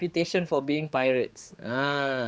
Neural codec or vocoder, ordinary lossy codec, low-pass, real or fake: codec, 16 kHz, 4 kbps, X-Codec, HuBERT features, trained on general audio; none; none; fake